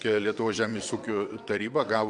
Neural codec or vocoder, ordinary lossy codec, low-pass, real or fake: vocoder, 22.05 kHz, 80 mel bands, WaveNeXt; MP3, 64 kbps; 9.9 kHz; fake